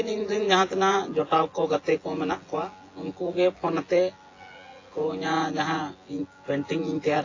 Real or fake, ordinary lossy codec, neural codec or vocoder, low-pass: fake; AAC, 32 kbps; vocoder, 24 kHz, 100 mel bands, Vocos; 7.2 kHz